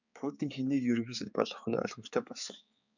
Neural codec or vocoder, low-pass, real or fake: codec, 16 kHz, 4 kbps, X-Codec, HuBERT features, trained on balanced general audio; 7.2 kHz; fake